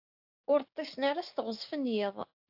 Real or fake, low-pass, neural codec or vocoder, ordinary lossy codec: fake; 5.4 kHz; codec, 16 kHz, 6 kbps, DAC; AAC, 48 kbps